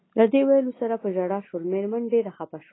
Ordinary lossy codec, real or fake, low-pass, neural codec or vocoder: AAC, 16 kbps; real; 7.2 kHz; none